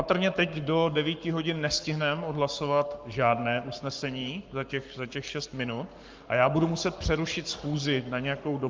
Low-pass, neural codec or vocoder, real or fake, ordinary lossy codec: 7.2 kHz; codec, 44.1 kHz, 7.8 kbps, Pupu-Codec; fake; Opus, 24 kbps